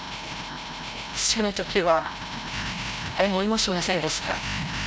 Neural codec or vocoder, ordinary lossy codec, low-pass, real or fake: codec, 16 kHz, 0.5 kbps, FreqCodec, larger model; none; none; fake